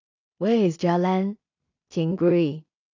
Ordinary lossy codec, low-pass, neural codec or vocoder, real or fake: AAC, 48 kbps; 7.2 kHz; codec, 16 kHz in and 24 kHz out, 0.4 kbps, LongCat-Audio-Codec, two codebook decoder; fake